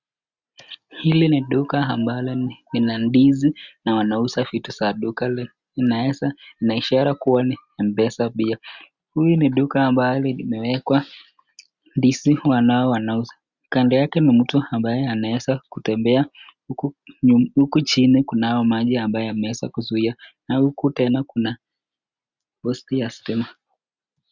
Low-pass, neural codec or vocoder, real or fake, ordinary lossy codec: 7.2 kHz; none; real; Opus, 64 kbps